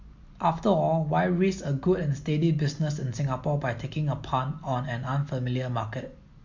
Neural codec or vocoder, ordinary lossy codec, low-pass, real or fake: none; MP3, 48 kbps; 7.2 kHz; real